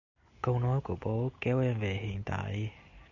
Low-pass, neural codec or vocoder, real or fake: 7.2 kHz; none; real